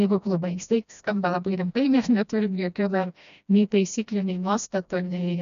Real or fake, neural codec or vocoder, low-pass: fake; codec, 16 kHz, 1 kbps, FreqCodec, smaller model; 7.2 kHz